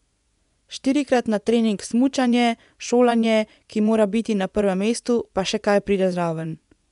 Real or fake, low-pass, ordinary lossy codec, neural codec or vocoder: fake; 10.8 kHz; none; vocoder, 24 kHz, 100 mel bands, Vocos